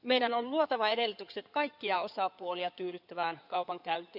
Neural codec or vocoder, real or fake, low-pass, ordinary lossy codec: codec, 16 kHz in and 24 kHz out, 2.2 kbps, FireRedTTS-2 codec; fake; 5.4 kHz; none